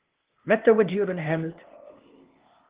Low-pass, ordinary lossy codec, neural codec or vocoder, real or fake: 3.6 kHz; Opus, 16 kbps; codec, 16 kHz, 0.8 kbps, ZipCodec; fake